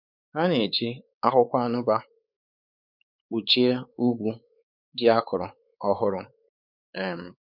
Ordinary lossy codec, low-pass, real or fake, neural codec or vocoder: none; 5.4 kHz; fake; codec, 16 kHz, 4 kbps, X-Codec, WavLM features, trained on Multilingual LibriSpeech